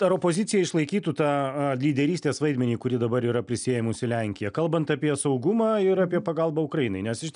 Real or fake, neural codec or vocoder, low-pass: real; none; 9.9 kHz